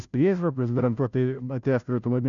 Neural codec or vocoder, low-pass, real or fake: codec, 16 kHz, 0.5 kbps, FunCodec, trained on Chinese and English, 25 frames a second; 7.2 kHz; fake